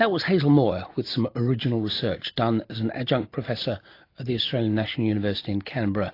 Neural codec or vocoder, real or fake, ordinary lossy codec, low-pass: none; real; AAC, 32 kbps; 5.4 kHz